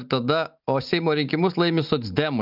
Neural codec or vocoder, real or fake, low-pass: none; real; 5.4 kHz